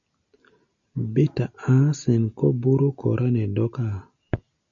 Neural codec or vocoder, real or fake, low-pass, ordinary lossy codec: none; real; 7.2 kHz; MP3, 96 kbps